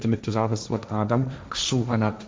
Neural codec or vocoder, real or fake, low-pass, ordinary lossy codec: codec, 16 kHz, 1.1 kbps, Voila-Tokenizer; fake; none; none